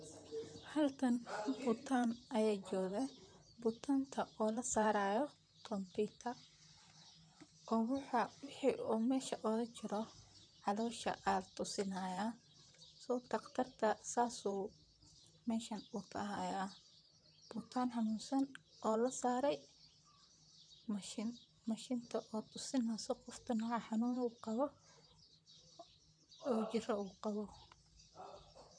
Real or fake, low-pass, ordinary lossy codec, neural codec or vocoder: fake; 9.9 kHz; none; vocoder, 44.1 kHz, 128 mel bands, Pupu-Vocoder